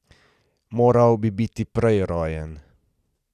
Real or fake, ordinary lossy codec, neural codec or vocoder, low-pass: real; none; none; 14.4 kHz